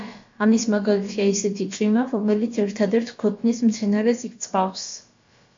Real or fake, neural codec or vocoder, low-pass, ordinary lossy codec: fake; codec, 16 kHz, about 1 kbps, DyCAST, with the encoder's durations; 7.2 kHz; MP3, 48 kbps